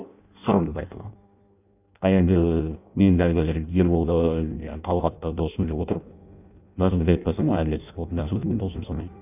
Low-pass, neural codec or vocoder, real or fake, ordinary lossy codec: 3.6 kHz; codec, 16 kHz in and 24 kHz out, 0.6 kbps, FireRedTTS-2 codec; fake; none